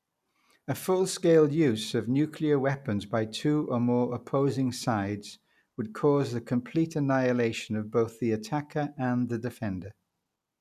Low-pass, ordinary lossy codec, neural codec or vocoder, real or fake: 14.4 kHz; none; none; real